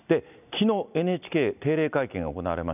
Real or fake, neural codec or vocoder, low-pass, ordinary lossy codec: real; none; 3.6 kHz; none